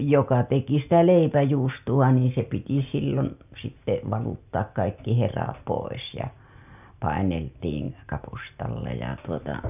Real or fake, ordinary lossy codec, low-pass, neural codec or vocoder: real; none; 3.6 kHz; none